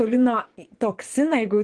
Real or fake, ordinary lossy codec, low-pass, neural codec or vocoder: fake; Opus, 16 kbps; 9.9 kHz; vocoder, 22.05 kHz, 80 mel bands, Vocos